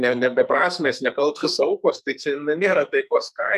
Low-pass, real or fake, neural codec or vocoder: 14.4 kHz; fake; codec, 44.1 kHz, 2.6 kbps, SNAC